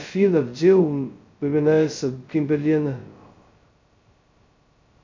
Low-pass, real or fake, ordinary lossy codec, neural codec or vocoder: 7.2 kHz; fake; MP3, 48 kbps; codec, 16 kHz, 0.2 kbps, FocalCodec